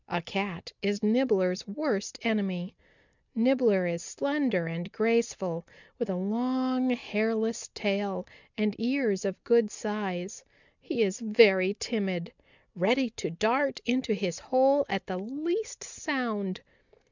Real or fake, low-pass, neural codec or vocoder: real; 7.2 kHz; none